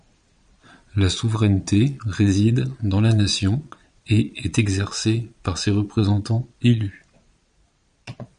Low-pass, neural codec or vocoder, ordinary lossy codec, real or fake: 9.9 kHz; vocoder, 22.05 kHz, 80 mel bands, Vocos; MP3, 96 kbps; fake